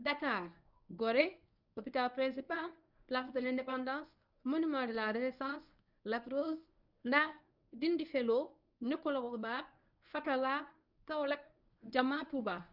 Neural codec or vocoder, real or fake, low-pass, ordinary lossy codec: codec, 24 kHz, 0.9 kbps, WavTokenizer, medium speech release version 1; fake; 5.4 kHz; none